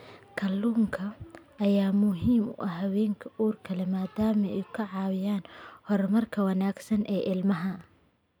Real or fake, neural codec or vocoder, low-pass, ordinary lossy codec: real; none; 19.8 kHz; none